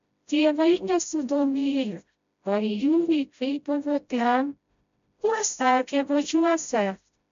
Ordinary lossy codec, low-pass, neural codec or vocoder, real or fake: MP3, 64 kbps; 7.2 kHz; codec, 16 kHz, 0.5 kbps, FreqCodec, smaller model; fake